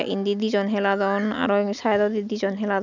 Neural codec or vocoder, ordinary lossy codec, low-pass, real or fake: none; none; 7.2 kHz; real